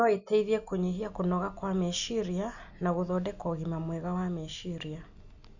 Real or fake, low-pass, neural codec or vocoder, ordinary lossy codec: real; 7.2 kHz; none; none